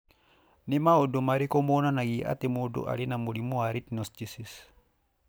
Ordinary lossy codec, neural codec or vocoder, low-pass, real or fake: none; none; none; real